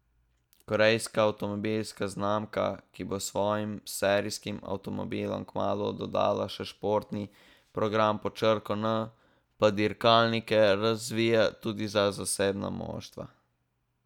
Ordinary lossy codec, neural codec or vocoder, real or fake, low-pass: none; none; real; 19.8 kHz